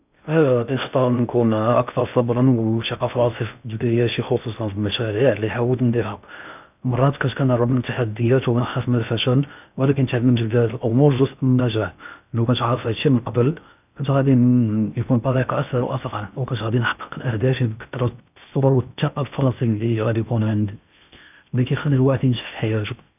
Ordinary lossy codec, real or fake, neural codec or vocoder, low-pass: none; fake; codec, 16 kHz in and 24 kHz out, 0.6 kbps, FocalCodec, streaming, 2048 codes; 3.6 kHz